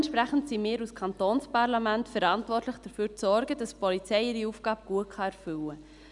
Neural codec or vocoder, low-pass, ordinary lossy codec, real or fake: none; 10.8 kHz; none; real